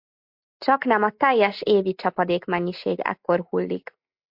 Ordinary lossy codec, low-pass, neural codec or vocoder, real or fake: MP3, 48 kbps; 5.4 kHz; none; real